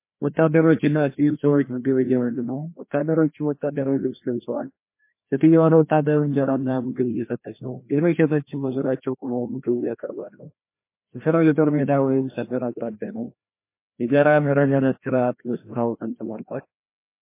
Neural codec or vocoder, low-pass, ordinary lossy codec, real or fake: codec, 16 kHz, 1 kbps, FreqCodec, larger model; 3.6 kHz; MP3, 24 kbps; fake